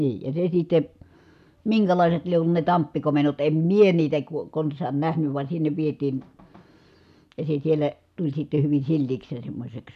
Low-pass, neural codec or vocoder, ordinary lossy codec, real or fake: 14.4 kHz; none; none; real